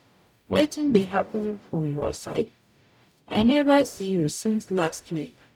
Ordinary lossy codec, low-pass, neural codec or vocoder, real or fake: none; 19.8 kHz; codec, 44.1 kHz, 0.9 kbps, DAC; fake